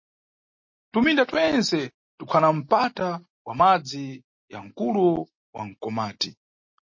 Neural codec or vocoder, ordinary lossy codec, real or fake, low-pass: none; MP3, 32 kbps; real; 7.2 kHz